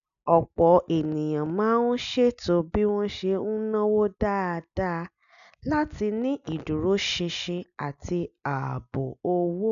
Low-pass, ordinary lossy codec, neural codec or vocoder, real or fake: 7.2 kHz; none; none; real